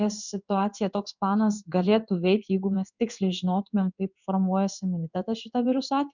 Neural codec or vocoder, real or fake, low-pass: codec, 16 kHz in and 24 kHz out, 1 kbps, XY-Tokenizer; fake; 7.2 kHz